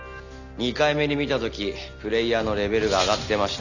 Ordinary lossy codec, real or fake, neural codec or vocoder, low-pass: none; real; none; 7.2 kHz